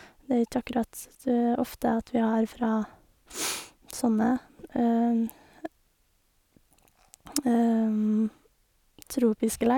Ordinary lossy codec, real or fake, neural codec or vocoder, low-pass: none; real; none; 19.8 kHz